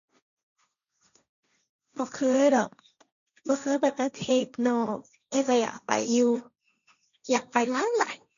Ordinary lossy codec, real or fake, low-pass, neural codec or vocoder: none; fake; 7.2 kHz; codec, 16 kHz, 1.1 kbps, Voila-Tokenizer